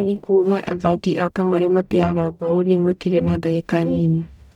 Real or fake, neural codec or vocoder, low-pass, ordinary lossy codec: fake; codec, 44.1 kHz, 0.9 kbps, DAC; 19.8 kHz; none